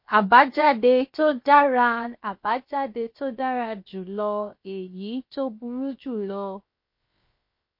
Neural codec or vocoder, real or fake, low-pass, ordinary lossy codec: codec, 16 kHz, 0.7 kbps, FocalCodec; fake; 5.4 kHz; MP3, 32 kbps